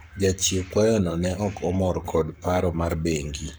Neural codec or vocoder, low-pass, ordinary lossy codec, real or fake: codec, 44.1 kHz, 7.8 kbps, Pupu-Codec; none; none; fake